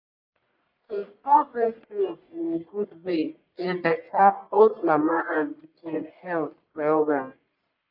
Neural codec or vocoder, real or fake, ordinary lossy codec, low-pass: codec, 44.1 kHz, 1.7 kbps, Pupu-Codec; fake; none; 5.4 kHz